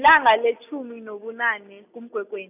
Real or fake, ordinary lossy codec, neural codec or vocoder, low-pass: real; none; none; 3.6 kHz